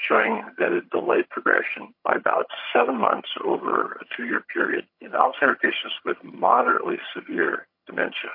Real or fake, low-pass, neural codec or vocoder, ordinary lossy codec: fake; 5.4 kHz; vocoder, 22.05 kHz, 80 mel bands, HiFi-GAN; MP3, 32 kbps